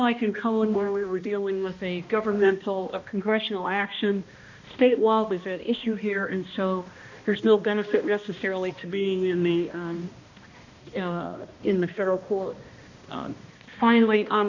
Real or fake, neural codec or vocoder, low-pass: fake; codec, 16 kHz, 1 kbps, X-Codec, HuBERT features, trained on balanced general audio; 7.2 kHz